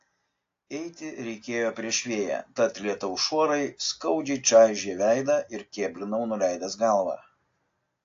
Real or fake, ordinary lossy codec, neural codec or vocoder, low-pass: real; AAC, 48 kbps; none; 7.2 kHz